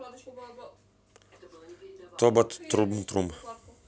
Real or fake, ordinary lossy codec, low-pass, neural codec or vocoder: real; none; none; none